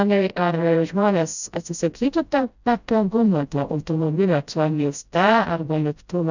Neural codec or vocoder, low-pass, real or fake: codec, 16 kHz, 0.5 kbps, FreqCodec, smaller model; 7.2 kHz; fake